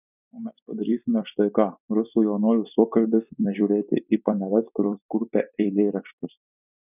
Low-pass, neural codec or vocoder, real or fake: 3.6 kHz; vocoder, 44.1 kHz, 128 mel bands every 256 samples, BigVGAN v2; fake